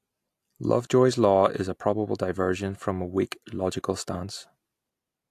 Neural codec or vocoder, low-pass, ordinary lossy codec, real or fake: none; 14.4 kHz; AAC, 64 kbps; real